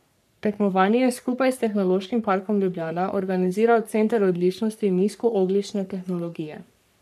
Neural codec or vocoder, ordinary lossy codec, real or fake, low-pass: codec, 44.1 kHz, 3.4 kbps, Pupu-Codec; none; fake; 14.4 kHz